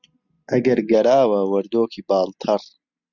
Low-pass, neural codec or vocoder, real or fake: 7.2 kHz; none; real